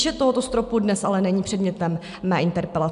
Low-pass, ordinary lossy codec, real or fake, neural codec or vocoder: 10.8 kHz; MP3, 96 kbps; real; none